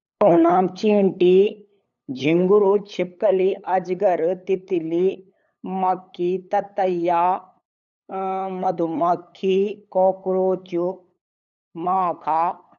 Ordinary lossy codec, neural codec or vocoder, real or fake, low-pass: none; codec, 16 kHz, 8 kbps, FunCodec, trained on LibriTTS, 25 frames a second; fake; 7.2 kHz